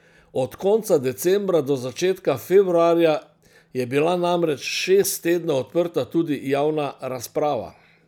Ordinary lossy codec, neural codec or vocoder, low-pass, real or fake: none; none; 19.8 kHz; real